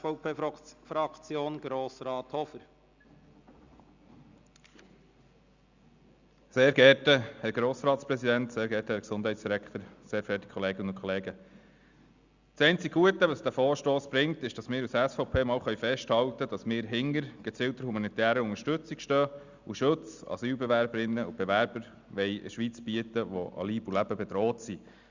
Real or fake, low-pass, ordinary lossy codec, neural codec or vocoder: real; 7.2 kHz; Opus, 64 kbps; none